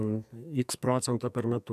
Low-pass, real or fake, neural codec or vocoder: 14.4 kHz; fake; codec, 44.1 kHz, 2.6 kbps, SNAC